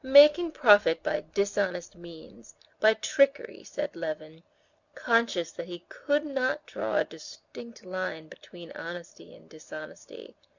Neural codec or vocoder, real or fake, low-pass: none; real; 7.2 kHz